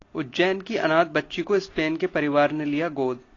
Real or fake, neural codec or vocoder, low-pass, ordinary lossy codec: real; none; 7.2 kHz; AAC, 32 kbps